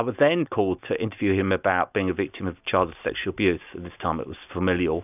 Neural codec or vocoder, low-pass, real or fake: none; 3.6 kHz; real